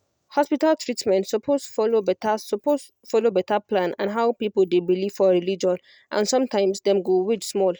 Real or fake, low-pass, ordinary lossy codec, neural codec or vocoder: real; none; none; none